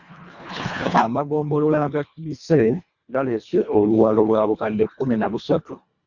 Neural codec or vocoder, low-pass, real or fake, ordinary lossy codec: codec, 24 kHz, 1.5 kbps, HILCodec; 7.2 kHz; fake; Opus, 64 kbps